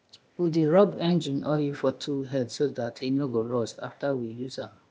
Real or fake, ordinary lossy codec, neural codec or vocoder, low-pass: fake; none; codec, 16 kHz, 0.8 kbps, ZipCodec; none